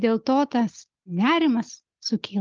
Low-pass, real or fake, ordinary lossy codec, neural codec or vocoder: 7.2 kHz; real; Opus, 24 kbps; none